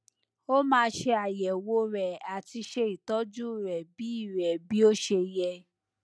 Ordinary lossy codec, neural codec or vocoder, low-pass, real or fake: none; none; none; real